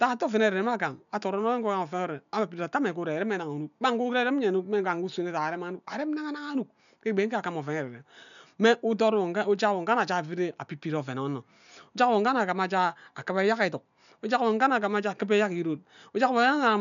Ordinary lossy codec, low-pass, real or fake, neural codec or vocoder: none; 7.2 kHz; real; none